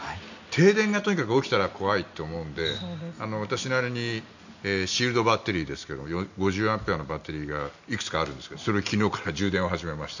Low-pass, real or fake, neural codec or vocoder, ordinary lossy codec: 7.2 kHz; real; none; none